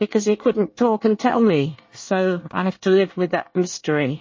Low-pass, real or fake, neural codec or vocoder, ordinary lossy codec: 7.2 kHz; fake; codec, 24 kHz, 1 kbps, SNAC; MP3, 32 kbps